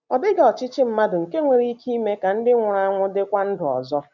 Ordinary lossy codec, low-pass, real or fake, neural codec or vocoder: none; 7.2 kHz; real; none